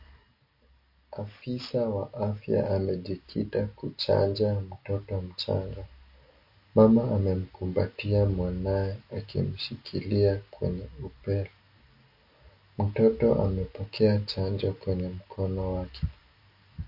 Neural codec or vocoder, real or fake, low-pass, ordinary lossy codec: none; real; 5.4 kHz; MP3, 32 kbps